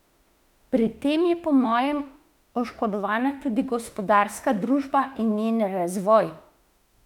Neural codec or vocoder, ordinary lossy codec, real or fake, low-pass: autoencoder, 48 kHz, 32 numbers a frame, DAC-VAE, trained on Japanese speech; none; fake; 19.8 kHz